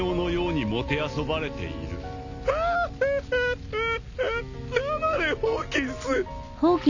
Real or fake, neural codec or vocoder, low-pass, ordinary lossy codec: real; none; 7.2 kHz; none